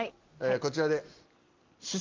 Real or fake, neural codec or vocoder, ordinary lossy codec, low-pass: real; none; Opus, 24 kbps; 7.2 kHz